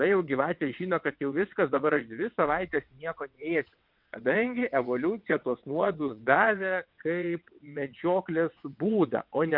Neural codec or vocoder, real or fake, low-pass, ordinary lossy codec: vocoder, 22.05 kHz, 80 mel bands, WaveNeXt; fake; 5.4 kHz; MP3, 48 kbps